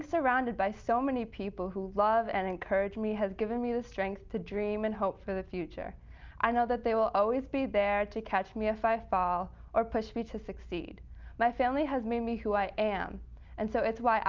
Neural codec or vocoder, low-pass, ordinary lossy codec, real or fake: none; 7.2 kHz; Opus, 24 kbps; real